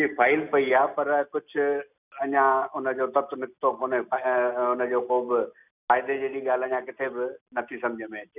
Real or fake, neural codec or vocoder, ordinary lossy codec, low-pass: real; none; none; 3.6 kHz